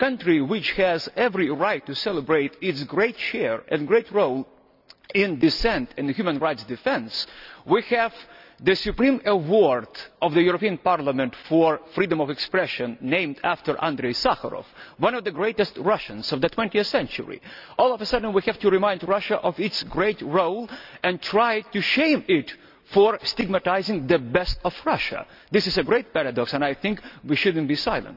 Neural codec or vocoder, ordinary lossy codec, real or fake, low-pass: none; none; real; 5.4 kHz